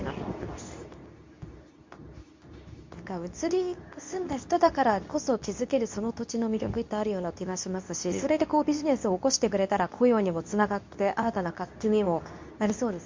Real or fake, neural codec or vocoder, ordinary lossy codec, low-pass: fake; codec, 24 kHz, 0.9 kbps, WavTokenizer, medium speech release version 2; MP3, 48 kbps; 7.2 kHz